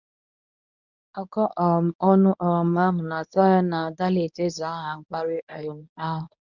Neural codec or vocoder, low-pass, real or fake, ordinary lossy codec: codec, 24 kHz, 0.9 kbps, WavTokenizer, medium speech release version 1; 7.2 kHz; fake; none